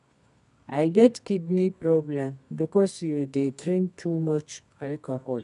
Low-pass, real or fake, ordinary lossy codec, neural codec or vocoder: 10.8 kHz; fake; none; codec, 24 kHz, 0.9 kbps, WavTokenizer, medium music audio release